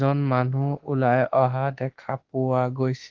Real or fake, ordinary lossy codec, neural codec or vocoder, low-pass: fake; Opus, 16 kbps; autoencoder, 48 kHz, 32 numbers a frame, DAC-VAE, trained on Japanese speech; 7.2 kHz